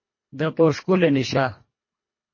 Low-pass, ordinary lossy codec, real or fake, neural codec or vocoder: 7.2 kHz; MP3, 32 kbps; fake; codec, 24 kHz, 1.5 kbps, HILCodec